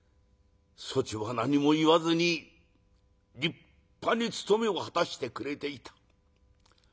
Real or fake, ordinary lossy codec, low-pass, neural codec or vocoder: real; none; none; none